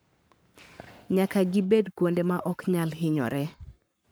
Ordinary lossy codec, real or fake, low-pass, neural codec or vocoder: none; fake; none; codec, 44.1 kHz, 7.8 kbps, Pupu-Codec